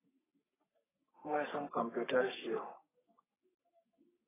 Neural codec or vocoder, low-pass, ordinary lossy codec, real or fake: codec, 44.1 kHz, 3.4 kbps, Pupu-Codec; 3.6 kHz; AAC, 16 kbps; fake